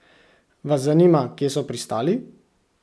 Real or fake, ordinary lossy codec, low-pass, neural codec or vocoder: real; none; none; none